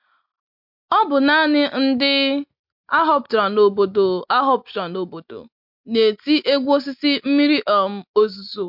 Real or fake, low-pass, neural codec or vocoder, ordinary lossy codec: real; 5.4 kHz; none; MP3, 48 kbps